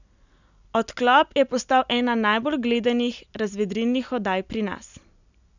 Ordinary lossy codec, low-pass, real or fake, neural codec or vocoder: none; 7.2 kHz; real; none